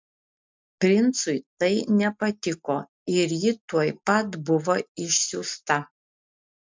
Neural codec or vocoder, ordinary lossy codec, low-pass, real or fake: none; MP3, 64 kbps; 7.2 kHz; real